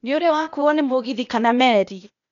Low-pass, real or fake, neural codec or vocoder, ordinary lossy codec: 7.2 kHz; fake; codec, 16 kHz, 0.8 kbps, ZipCodec; none